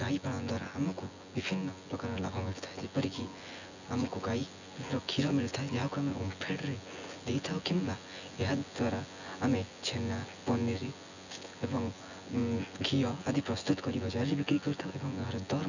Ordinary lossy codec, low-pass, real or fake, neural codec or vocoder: none; 7.2 kHz; fake; vocoder, 24 kHz, 100 mel bands, Vocos